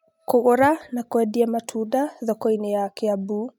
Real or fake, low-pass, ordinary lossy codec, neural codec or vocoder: real; 19.8 kHz; none; none